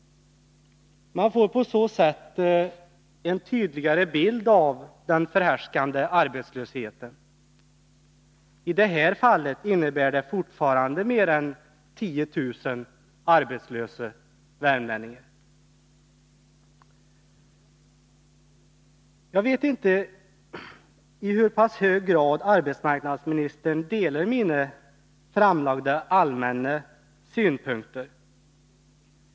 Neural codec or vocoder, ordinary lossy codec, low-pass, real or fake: none; none; none; real